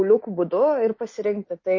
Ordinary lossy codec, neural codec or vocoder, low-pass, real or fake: MP3, 32 kbps; none; 7.2 kHz; real